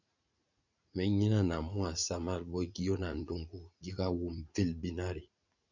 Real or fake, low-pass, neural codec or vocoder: fake; 7.2 kHz; vocoder, 44.1 kHz, 80 mel bands, Vocos